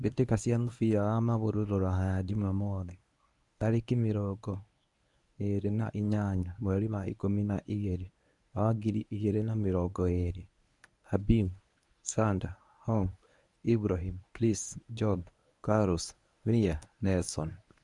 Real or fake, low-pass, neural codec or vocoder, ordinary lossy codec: fake; 10.8 kHz; codec, 24 kHz, 0.9 kbps, WavTokenizer, medium speech release version 1; none